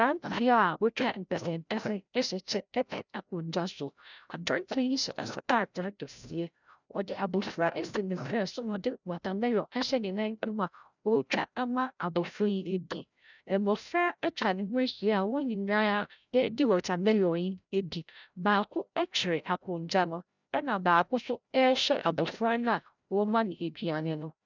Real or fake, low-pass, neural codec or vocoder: fake; 7.2 kHz; codec, 16 kHz, 0.5 kbps, FreqCodec, larger model